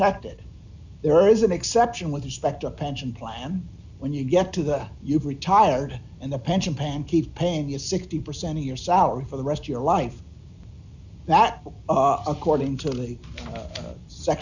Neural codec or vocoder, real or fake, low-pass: none; real; 7.2 kHz